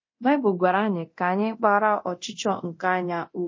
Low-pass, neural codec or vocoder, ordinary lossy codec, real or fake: 7.2 kHz; codec, 24 kHz, 0.9 kbps, DualCodec; MP3, 32 kbps; fake